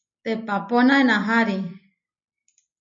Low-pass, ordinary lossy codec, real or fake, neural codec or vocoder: 7.2 kHz; MP3, 32 kbps; real; none